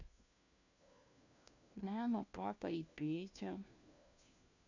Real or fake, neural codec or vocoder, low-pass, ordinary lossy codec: fake; codec, 16 kHz, 1 kbps, FunCodec, trained on LibriTTS, 50 frames a second; 7.2 kHz; AAC, 48 kbps